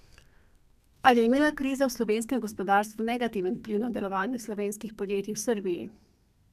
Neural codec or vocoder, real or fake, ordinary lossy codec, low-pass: codec, 32 kHz, 1.9 kbps, SNAC; fake; none; 14.4 kHz